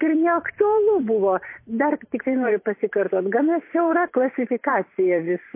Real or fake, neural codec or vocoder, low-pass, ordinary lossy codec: fake; vocoder, 44.1 kHz, 128 mel bands every 512 samples, BigVGAN v2; 3.6 kHz; MP3, 24 kbps